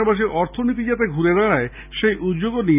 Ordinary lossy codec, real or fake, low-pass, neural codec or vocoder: none; real; 3.6 kHz; none